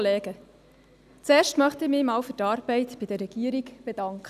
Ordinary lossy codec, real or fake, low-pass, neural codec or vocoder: none; real; 14.4 kHz; none